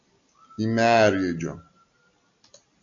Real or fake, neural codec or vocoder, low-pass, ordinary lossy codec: real; none; 7.2 kHz; MP3, 64 kbps